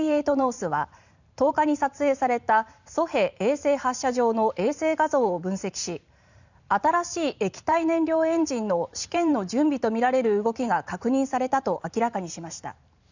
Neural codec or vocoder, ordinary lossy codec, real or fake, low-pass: vocoder, 44.1 kHz, 128 mel bands every 512 samples, BigVGAN v2; none; fake; 7.2 kHz